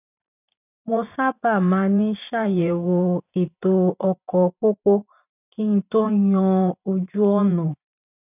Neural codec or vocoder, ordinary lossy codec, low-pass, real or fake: vocoder, 44.1 kHz, 128 mel bands every 512 samples, BigVGAN v2; none; 3.6 kHz; fake